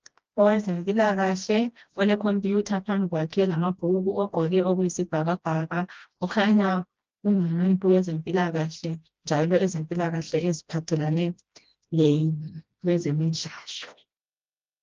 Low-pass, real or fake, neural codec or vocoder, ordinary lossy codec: 7.2 kHz; fake; codec, 16 kHz, 1 kbps, FreqCodec, smaller model; Opus, 24 kbps